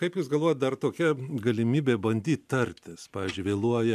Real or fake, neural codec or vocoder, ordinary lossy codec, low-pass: real; none; MP3, 96 kbps; 14.4 kHz